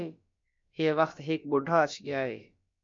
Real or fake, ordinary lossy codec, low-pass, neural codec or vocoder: fake; MP3, 48 kbps; 7.2 kHz; codec, 16 kHz, about 1 kbps, DyCAST, with the encoder's durations